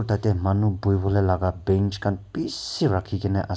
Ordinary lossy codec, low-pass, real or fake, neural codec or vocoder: none; none; real; none